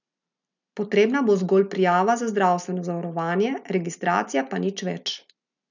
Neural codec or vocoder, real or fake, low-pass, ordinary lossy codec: none; real; 7.2 kHz; none